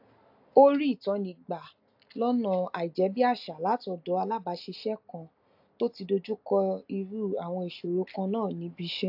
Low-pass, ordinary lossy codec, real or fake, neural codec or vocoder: 5.4 kHz; AAC, 48 kbps; real; none